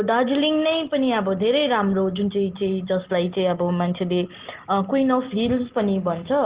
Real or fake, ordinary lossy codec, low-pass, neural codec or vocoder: real; Opus, 16 kbps; 3.6 kHz; none